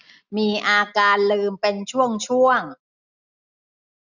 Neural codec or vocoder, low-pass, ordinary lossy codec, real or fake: none; 7.2 kHz; none; real